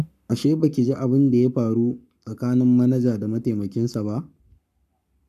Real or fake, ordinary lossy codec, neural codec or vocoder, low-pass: fake; none; codec, 44.1 kHz, 7.8 kbps, Pupu-Codec; 14.4 kHz